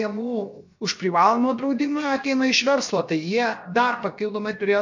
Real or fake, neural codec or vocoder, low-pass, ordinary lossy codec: fake; codec, 16 kHz, 0.7 kbps, FocalCodec; 7.2 kHz; MP3, 48 kbps